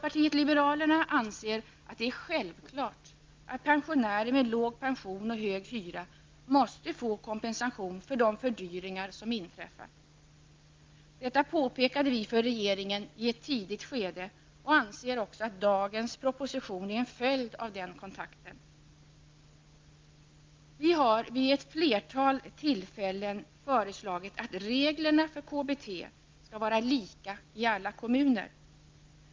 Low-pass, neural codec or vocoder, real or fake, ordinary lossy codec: 7.2 kHz; none; real; Opus, 24 kbps